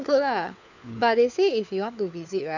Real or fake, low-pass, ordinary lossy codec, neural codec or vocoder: fake; 7.2 kHz; none; codec, 16 kHz, 4 kbps, FunCodec, trained on LibriTTS, 50 frames a second